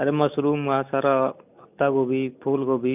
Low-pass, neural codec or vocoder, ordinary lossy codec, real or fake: 3.6 kHz; none; none; real